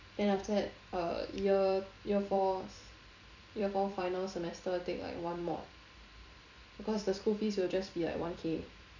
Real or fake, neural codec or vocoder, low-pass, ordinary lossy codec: fake; vocoder, 44.1 kHz, 128 mel bands every 256 samples, BigVGAN v2; 7.2 kHz; none